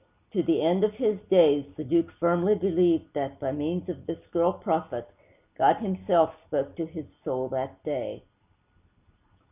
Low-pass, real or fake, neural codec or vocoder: 3.6 kHz; real; none